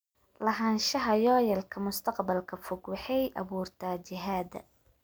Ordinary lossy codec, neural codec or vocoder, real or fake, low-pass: none; none; real; none